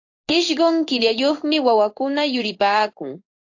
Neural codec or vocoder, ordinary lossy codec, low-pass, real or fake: codec, 16 kHz in and 24 kHz out, 1 kbps, XY-Tokenizer; AAC, 48 kbps; 7.2 kHz; fake